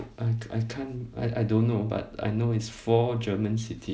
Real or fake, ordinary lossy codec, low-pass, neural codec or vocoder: real; none; none; none